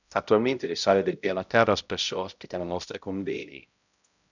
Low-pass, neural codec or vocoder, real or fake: 7.2 kHz; codec, 16 kHz, 0.5 kbps, X-Codec, HuBERT features, trained on balanced general audio; fake